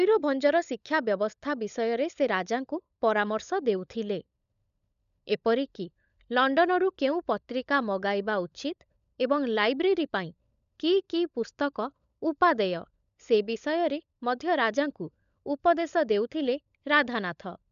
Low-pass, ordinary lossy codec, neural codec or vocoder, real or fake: 7.2 kHz; none; codec, 16 kHz, 16 kbps, FunCodec, trained on LibriTTS, 50 frames a second; fake